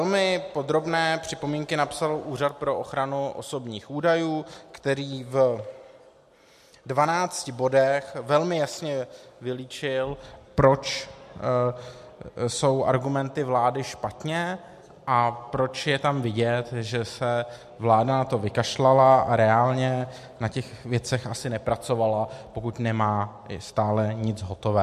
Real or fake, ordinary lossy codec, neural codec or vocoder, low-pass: real; MP3, 64 kbps; none; 14.4 kHz